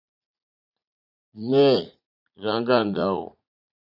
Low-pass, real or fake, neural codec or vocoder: 5.4 kHz; fake; vocoder, 44.1 kHz, 80 mel bands, Vocos